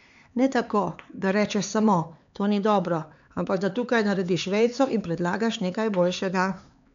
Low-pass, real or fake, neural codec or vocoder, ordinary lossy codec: 7.2 kHz; fake; codec, 16 kHz, 4 kbps, X-Codec, HuBERT features, trained on LibriSpeech; MP3, 64 kbps